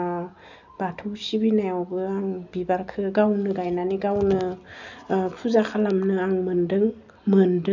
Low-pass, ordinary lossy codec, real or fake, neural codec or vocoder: 7.2 kHz; none; real; none